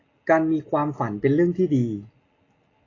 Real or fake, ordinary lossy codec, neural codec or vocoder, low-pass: real; AAC, 32 kbps; none; 7.2 kHz